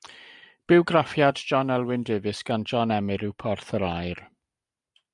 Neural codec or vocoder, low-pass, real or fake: none; 10.8 kHz; real